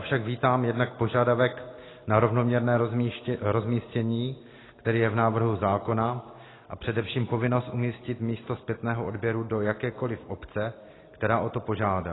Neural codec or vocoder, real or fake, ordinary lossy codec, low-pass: none; real; AAC, 16 kbps; 7.2 kHz